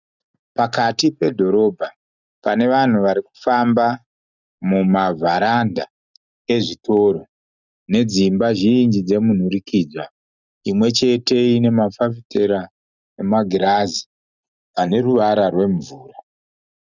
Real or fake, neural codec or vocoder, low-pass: real; none; 7.2 kHz